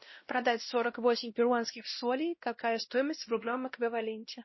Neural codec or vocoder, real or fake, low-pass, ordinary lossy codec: codec, 16 kHz, 1 kbps, X-Codec, WavLM features, trained on Multilingual LibriSpeech; fake; 7.2 kHz; MP3, 24 kbps